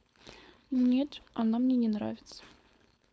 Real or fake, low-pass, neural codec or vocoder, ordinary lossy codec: fake; none; codec, 16 kHz, 4.8 kbps, FACodec; none